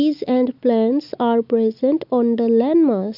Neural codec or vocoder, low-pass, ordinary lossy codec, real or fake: none; 5.4 kHz; AAC, 48 kbps; real